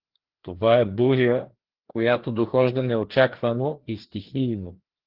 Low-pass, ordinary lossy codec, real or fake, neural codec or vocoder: 5.4 kHz; Opus, 16 kbps; fake; codec, 16 kHz, 2 kbps, FreqCodec, larger model